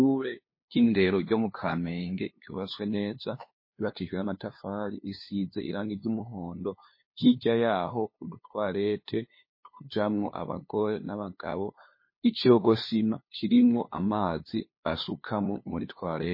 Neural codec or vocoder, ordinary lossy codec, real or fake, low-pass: codec, 16 kHz, 2 kbps, FunCodec, trained on LibriTTS, 25 frames a second; MP3, 24 kbps; fake; 5.4 kHz